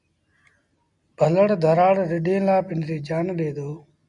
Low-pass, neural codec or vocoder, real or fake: 10.8 kHz; none; real